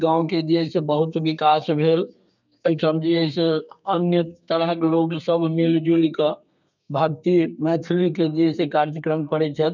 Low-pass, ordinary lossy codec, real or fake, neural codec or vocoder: 7.2 kHz; none; fake; codec, 32 kHz, 1.9 kbps, SNAC